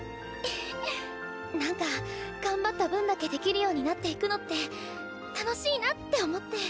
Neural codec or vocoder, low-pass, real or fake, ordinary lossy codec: none; none; real; none